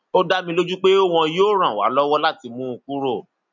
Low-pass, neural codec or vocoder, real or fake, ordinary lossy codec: 7.2 kHz; none; real; none